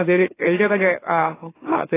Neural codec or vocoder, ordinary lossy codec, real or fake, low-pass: autoencoder, 44.1 kHz, a latent of 192 numbers a frame, MeloTTS; AAC, 16 kbps; fake; 3.6 kHz